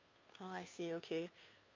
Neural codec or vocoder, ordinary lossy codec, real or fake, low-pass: codec, 16 kHz, 2 kbps, FunCodec, trained on Chinese and English, 25 frames a second; MP3, 64 kbps; fake; 7.2 kHz